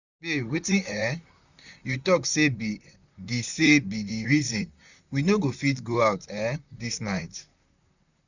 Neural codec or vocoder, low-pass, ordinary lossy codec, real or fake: vocoder, 44.1 kHz, 128 mel bands, Pupu-Vocoder; 7.2 kHz; none; fake